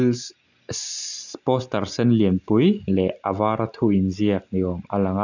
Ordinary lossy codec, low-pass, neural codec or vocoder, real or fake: none; 7.2 kHz; none; real